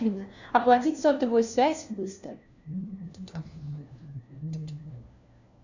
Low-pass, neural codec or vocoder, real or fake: 7.2 kHz; codec, 16 kHz, 1 kbps, FunCodec, trained on LibriTTS, 50 frames a second; fake